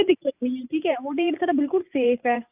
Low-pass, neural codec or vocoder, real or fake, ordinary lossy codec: 3.6 kHz; vocoder, 44.1 kHz, 128 mel bands every 512 samples, BigVGAN v2; fake; AAC, 32 kbps